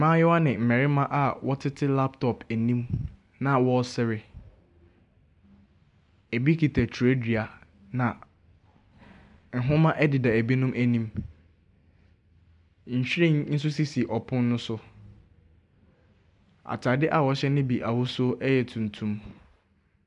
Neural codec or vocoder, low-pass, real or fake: none; 10.8 kHz; real